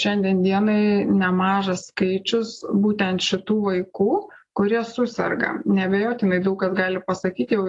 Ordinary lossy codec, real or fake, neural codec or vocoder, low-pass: AAC, 48 kbps; real; none; 10.8 kHz